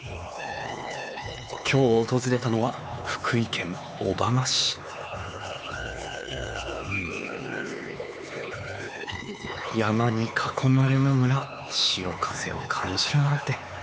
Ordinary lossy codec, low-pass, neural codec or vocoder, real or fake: none; none; codec, 16 kHz, 4 kbps, X-Codec, HuBERT features, trained on LibriSpeech; fake